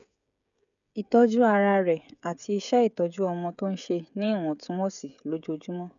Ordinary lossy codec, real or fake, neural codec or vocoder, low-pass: none; fake; codec, 16 kHz, 16 kbps, FreqCodec, smaller model; 7.2 kHz